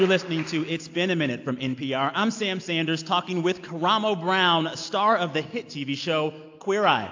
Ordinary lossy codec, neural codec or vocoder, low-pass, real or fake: AAC, 48 kbps; none; 7.2 kHz; real